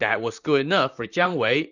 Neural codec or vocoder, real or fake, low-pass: vocoder, 44.1 kHz, 128 mel bands, Pupu-Vocoder; fake; 7.2 kHz